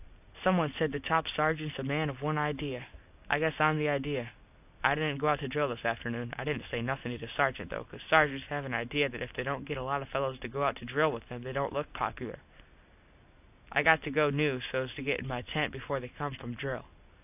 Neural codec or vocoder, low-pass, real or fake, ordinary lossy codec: none; 3.6 kHz; real; AAC, 32 kbps